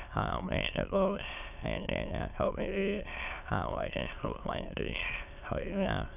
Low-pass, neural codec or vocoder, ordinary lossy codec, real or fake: 3.6 kHz; autoencoder, 22.05 kHz, a latent of 192 numbers a frame, VITS, trained on many speakers; none; fake